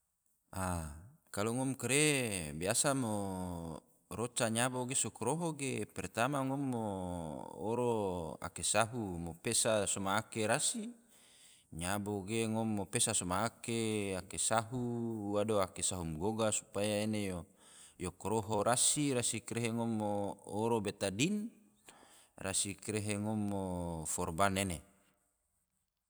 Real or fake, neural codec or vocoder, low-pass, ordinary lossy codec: fake; vocoder, 44.1 kHz, 128 mel bands every 256 samples, BigVGAN v2; none; none